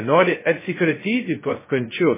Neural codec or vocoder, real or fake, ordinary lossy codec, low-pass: codec, 16 kHz, 0.2 kbps, FocalCodec; fake; MP3, 16 kbps; 3.6 kHz